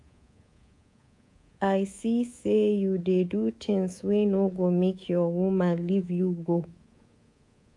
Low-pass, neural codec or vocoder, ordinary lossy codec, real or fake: 10.8 kHz; codec, 24 kHz, 3.1 kbps, DualCodec; AAC, 48 kbps; fake